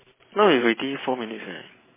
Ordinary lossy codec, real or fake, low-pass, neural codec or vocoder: MP3, 16 kbps; fake; 3.6 kHz; vocoder, 44.1 kHz, 128 mel bands every 512 samples, BigVGAN v2